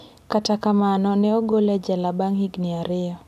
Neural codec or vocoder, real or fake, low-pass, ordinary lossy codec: none; real; 14.4 kHz; none